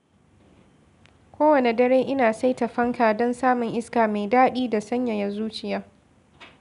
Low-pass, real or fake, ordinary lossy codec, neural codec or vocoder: 10.8 kHz; real; none; none